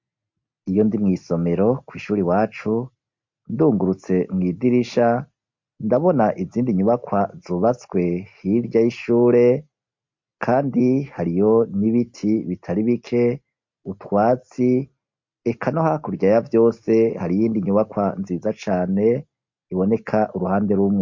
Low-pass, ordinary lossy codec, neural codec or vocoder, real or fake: 7.2 kHz; MP3, 48 kbps; none; real